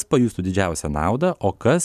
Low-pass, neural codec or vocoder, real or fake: 14.4 kHz; none; real